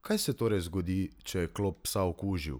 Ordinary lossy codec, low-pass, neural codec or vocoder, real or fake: none; none; none; real